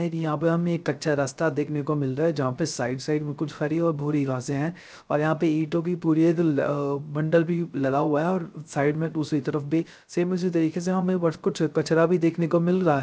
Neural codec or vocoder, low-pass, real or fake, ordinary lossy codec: codec, 16 kHz, 0.3 kbps, FocalCodec; none; fake; none